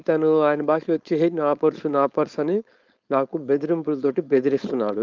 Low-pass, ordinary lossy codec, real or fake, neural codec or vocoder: 7.2 kHz; Opus, 32 kbps; fake; codec, 16 kHz, 4.8 kbps, FACodec